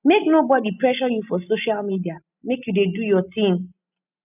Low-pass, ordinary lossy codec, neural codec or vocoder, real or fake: 3.6 kHz; none; none; real